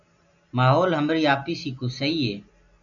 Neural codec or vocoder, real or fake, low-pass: none; real; 7.2 kHz